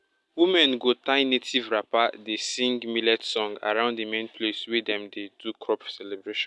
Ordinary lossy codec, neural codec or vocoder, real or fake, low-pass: none; none; real; none